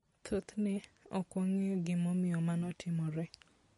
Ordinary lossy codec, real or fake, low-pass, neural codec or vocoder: MP3, 48 kbps; real; 14.4 kHz; none